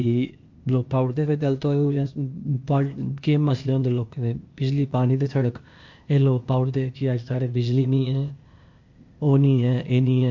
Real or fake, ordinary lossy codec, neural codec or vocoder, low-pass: fake; MP3, 48 kbps; codec, 16 kHz, 0.8 kbps, ZipCodec; 7.2 kHz